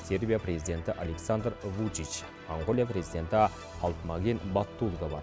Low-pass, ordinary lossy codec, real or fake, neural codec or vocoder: none; none; real; none